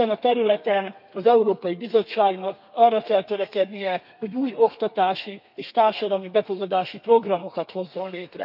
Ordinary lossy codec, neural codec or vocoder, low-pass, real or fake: none; codec, 32 kHz, 1.9 kbps, SNAC; 5.4 kHz; fake